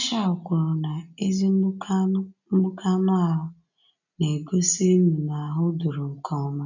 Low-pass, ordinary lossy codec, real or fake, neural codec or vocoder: 7.2 kHz; none; real; none